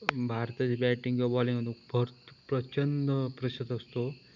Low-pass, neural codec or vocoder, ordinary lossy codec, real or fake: 7.2 kHz; none; none; real